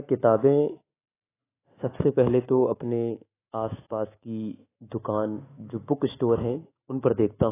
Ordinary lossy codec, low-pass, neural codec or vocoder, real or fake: AAC, 16 kbps; 3.6 kHz; autoencoder, 48 kHz, 128 numbers a frame, DAC-VAE, trained on Japanese speech; fake